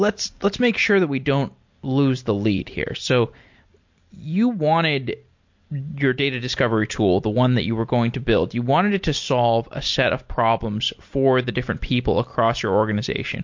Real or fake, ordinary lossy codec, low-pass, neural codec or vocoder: real; MP3, 48 kbps; 7.2 kHz; none